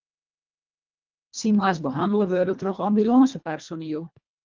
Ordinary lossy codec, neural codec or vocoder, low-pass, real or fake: Opus, 32 kbps; codec, 24 kHz, 1.5 kbps, HILCodec; 7.2 kHz; fake